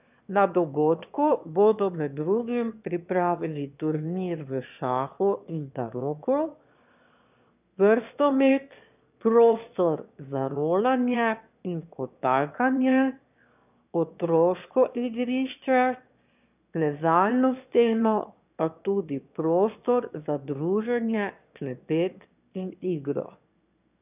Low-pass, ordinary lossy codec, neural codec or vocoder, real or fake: 3.6 kHz; none; autoencoder, 22.05 kHz, a latent of 192 numbers a frame, VITS, trained on one speaker; fake